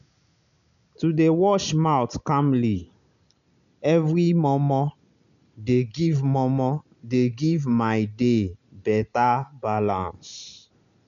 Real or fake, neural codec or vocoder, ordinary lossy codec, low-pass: real; none; none; 7.2 kHz